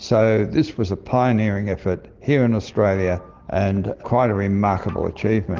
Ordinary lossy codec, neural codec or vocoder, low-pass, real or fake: Opus, 16 kbps; none; 7.2 kHz; real